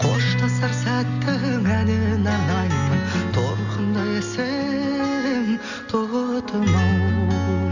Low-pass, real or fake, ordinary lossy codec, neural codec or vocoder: 7.2 kHz; real; none; none